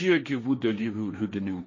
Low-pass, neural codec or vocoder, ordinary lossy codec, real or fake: 7.2 kHz; codec, 16 kHz, 1.1 kbps, Voila-Tokenizer; MP3, 32 kbps; fake